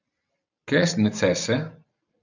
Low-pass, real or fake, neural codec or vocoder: 7.2 kHz; real; none